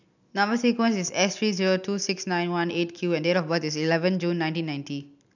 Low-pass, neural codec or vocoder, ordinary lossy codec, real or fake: 7.2 kHz; none; none; real